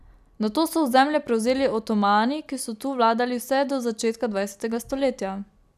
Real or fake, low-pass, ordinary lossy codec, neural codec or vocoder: real; 14.4 kHz; none; none